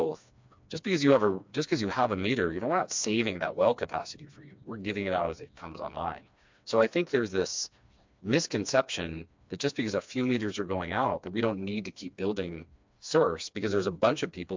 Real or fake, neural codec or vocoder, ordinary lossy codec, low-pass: fake; codec, 16 kHz, 2 kbps, FreqCodec, smaller model; MP3, 64 kbps; 7.2 kHz